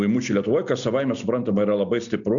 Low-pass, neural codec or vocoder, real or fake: 7.2 kHz; none; real